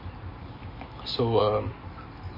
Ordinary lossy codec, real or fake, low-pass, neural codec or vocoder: MP3, 24 kbps; real; 5.4 kHz; none